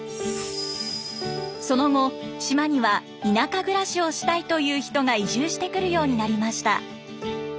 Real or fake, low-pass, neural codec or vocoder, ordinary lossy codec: real; none; none; none